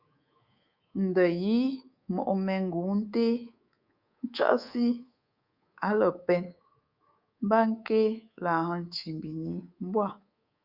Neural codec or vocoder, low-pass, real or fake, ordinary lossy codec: codec, 24 kHz, 3.1 kbps, DualCodec; 5.4 kHz; fake; Opus, 64 kbps